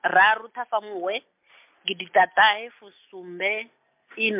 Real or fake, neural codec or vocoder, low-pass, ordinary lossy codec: real; none; 3.6 kHz; MP3, 32 kbps